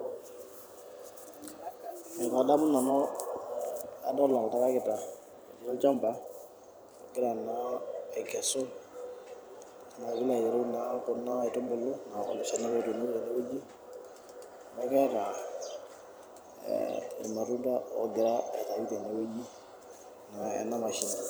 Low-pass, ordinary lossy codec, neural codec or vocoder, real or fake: none; none; vocoder, 44.1 kHz, 128 mel bands every 512 samples, BigVGAN v2; fake